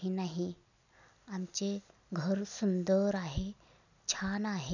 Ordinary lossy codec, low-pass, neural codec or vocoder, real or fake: none; 7.2 kHz; none; real